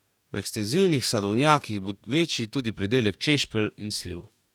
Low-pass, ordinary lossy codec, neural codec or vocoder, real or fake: 19.8 kHz; none; codec, 44.1 kHz, 2.6 kbps, DAC; fake